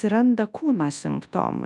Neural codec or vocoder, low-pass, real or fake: codec, 24 kHz, 0.9 kbps, WavTokenizer, large speech release; 10.8 kHz; fake